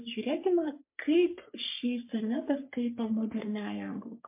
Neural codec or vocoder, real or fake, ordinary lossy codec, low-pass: codec, 44.1 kHz, 3.4 kbps, Pupu-Codec; fake; MP3, 24 kbps; 3.6 kHz